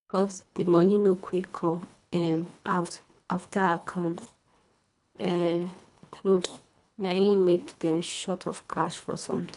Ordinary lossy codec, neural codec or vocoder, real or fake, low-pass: none; codec, 24 kHz, 1.5 kbps, HILCodec; fake; 10.8 kHz